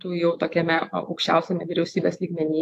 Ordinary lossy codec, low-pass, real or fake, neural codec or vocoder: AAC, 64 kbps; 14.4 kHz; fake; autoencoder, 48 kHz, 128 numbers a frame, DAC-VAE, trained on Japanese speech